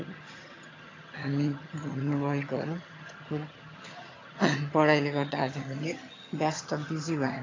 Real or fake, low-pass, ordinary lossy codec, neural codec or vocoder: fake; 7.2 kHz; AAC, 32 kbps; vocoder, 22.05 kHz, 80 mel bands, HiFi-GAN